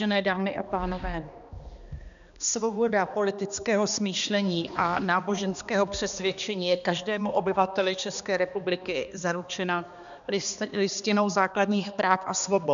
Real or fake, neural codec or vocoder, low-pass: fake; codec, 16 kHz, 2 kbps, X-Codec, HuBERT features, trained on balanced general audio; 7.2 kHz